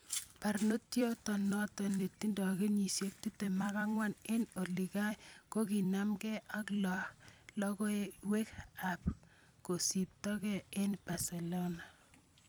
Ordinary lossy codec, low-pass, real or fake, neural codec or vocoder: none; none; fake; vocoder, 44.1 kHz, 128 mel bands every 256 samples, BigVGAN v2